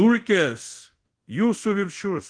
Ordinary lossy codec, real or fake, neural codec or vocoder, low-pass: Opus, 16 kbps; fake; codec, 24 kHz, 0.5 kbps, DualCodec; 9.9 kHz